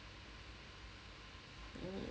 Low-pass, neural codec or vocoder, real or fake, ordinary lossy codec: none; none; real; none